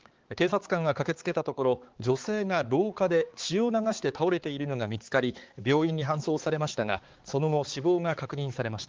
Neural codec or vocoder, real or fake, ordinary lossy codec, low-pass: codec, 16 kHz, 4 kbps, X-Codec, HuBERT features, trained on balanced general audio; fake; Opus, 16 kbps; 7.2 kHz